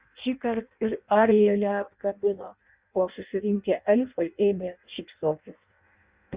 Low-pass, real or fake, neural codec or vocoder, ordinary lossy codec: 3.6 kHz; fake; codec, 16 kHz in and 24 kHz out, 0.6 kbps, FireRedTTS-2 codec; Opus, 64 kbps